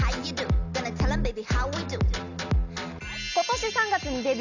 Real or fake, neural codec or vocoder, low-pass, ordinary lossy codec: real; none; 7.2 kHz; none